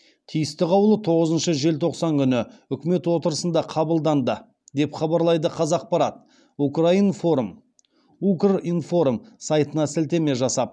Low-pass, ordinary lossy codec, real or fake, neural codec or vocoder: none; none; real; none